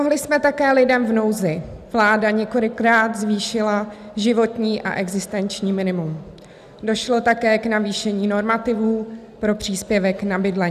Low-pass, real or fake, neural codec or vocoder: 14.4 kHz; real; none